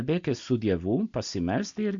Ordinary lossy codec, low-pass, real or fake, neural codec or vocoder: MP3, 64 kbps; 7.2 kHz; real; none